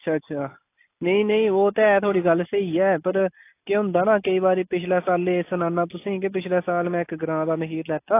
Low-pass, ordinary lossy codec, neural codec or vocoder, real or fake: 3.6 kHz; AAC, 24 kbps; none; real